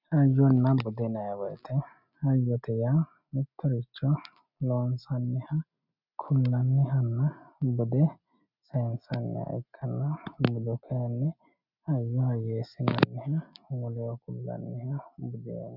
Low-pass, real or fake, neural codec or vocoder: 5.4 kHz; real; none